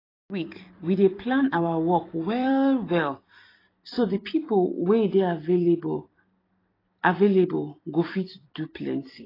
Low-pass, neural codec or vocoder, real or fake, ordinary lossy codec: 5.4 kHz; vocoder, 44.1 kHz, 128 mel bands every 256 samples, BigVGAN v2; fake; AAC, 24 kbps